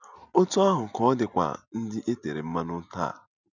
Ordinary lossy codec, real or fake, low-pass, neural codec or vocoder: none; real; 7.2 kHz; none